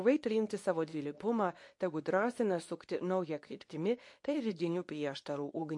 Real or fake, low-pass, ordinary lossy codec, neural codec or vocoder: fake; 10.8 kHz; MP3, 48 kbps; codec, 24 kHz, 0.9 kbps, WavTokenizer, medium speech release version 2